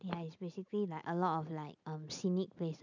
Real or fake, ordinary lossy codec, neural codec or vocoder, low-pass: real; none; none; 7.2 kHz